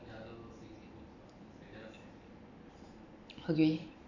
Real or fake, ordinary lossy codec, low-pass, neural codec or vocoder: real; AAC, 48 kbps; 7.2 kHz; none